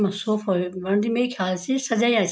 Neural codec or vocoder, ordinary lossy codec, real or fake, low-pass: none; none; real; none